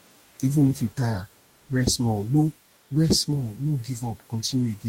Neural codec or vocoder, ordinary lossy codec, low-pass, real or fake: codec, 44.1 kHz, 2.6 kbps, DAC; MP3, 64 kbps; 19.8 kHz; fake